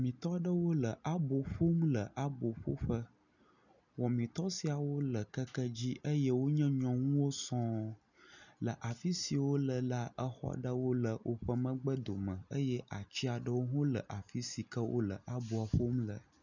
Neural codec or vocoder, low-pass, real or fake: none; 7.2 kHz; real